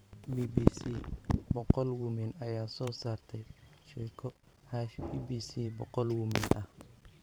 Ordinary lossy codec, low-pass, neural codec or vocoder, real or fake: none; none; vocoder, 44.1 kHz, 128 mel bands every 512 samples, BigVGAN v2; fake